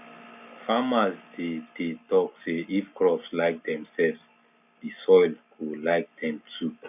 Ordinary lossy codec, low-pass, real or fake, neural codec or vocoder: none; 3.6 kHz; real; none